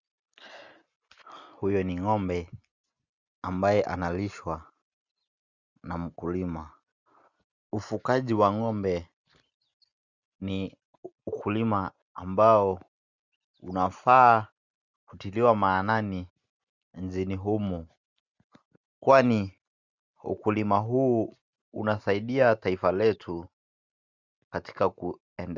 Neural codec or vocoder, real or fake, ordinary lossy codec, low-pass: none; real; Opus, 64 kbps; 7.2 kHz